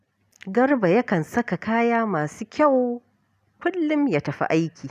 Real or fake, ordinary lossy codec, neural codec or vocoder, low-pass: real; none; none; 14.4 kHz